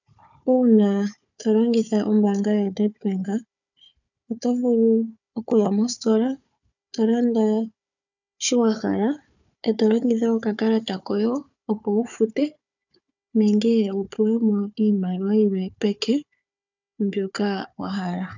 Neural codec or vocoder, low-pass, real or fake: codec, 16 kHz, 4 kbps, FunCodec, trained on Chinese and English, 50 frames a second; 7.2 kHz; fake